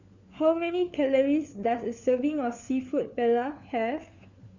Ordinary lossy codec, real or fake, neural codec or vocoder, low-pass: Opus, 64 kbps; fake; codec, 16 kHz, 4 kbps, FunCodec, trained on LibriTTS, 50 frames a second; 7.2 kHz